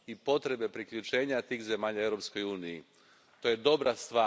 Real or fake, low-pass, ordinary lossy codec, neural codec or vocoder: real; none; none; none